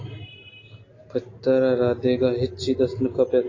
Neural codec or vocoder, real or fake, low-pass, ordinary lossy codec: none; real; 7.2 kHz; AAC, 32 kbps